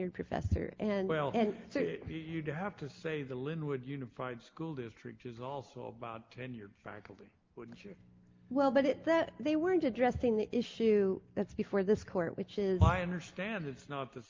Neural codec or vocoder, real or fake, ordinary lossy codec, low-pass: none; real; Opus, 24 kbps; 7.2 kHz